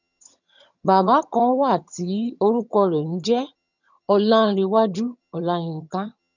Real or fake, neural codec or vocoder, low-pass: fake; vocoder, 22.05 kHz, 80 mel bands, HiFi-GAN; 7.2 kHz